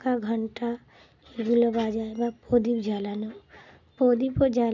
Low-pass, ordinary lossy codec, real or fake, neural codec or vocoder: 7.2 kHz; none; real; none